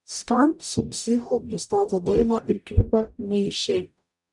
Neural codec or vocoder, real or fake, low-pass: codec, 44.1 kHz, 0.9 kbps, DAC; fake; 10.8 kHz